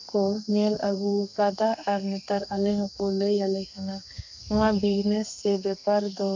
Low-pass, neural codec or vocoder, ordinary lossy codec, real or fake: 7.2 kHz; codec, 32 kHz, 1.9 kbps, SNAC; MP3, 64 kbps; fake